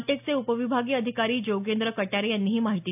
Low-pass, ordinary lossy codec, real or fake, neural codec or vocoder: 3.6 kHz; AAC, 32 kbps; real; none